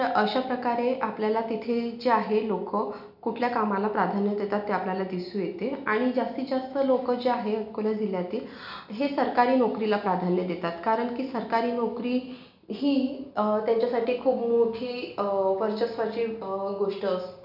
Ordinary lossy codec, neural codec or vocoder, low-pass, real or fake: none; none; 5.4 kHz; real